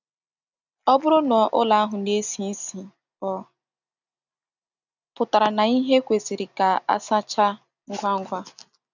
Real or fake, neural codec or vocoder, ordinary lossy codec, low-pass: real; none; none; 7.2 kHz